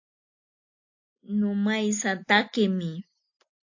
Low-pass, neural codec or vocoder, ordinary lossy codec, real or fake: 7.2 kHz; none; AAC, 48 kbps; real